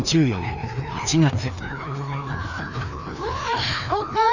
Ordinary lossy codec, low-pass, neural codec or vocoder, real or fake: none; 7.2 kHz; codec, 16 kHz, 2 kbps, FreqCodec, larger model; fake